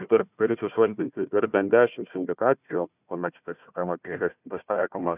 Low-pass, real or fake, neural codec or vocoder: 3.6 kHz; fake; codec, 16 kHz, 1 kbps, FunCodec, trained on Chinese and English, 50 frames a second